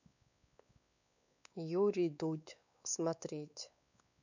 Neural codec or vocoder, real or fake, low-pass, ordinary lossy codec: codec, 16 kHz, 4 kbps, X-Codec, WavLM features, trained on Multilingual LibriSpeech; fake; 7.2 kHz; none